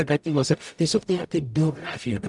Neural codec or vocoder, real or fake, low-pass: codec, 44.1 kHz, 0.9 kbps, DAC; fake; 10.8 kHz